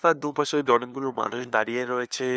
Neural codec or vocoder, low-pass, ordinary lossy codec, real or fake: codec, 16 kHz, 2 kbps, FunCodec, trained on LibriTTS, 25 frames a second; none; none; fake